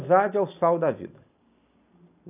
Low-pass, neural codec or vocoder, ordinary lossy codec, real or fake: 3.6 kHz; none; none; real